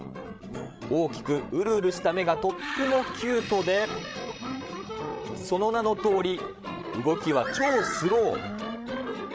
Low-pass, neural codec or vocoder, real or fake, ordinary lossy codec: none; codec, 16 kHz, 16 kbps, FreqCodec, larger model; fake; none